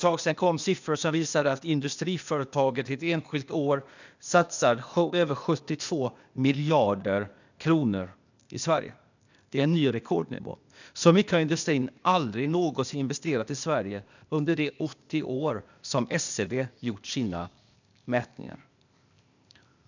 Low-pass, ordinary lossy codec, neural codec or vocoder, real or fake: 7.2 kHz; none; codec, 16 kHz, 0.8 kbps, ZipCodec; fake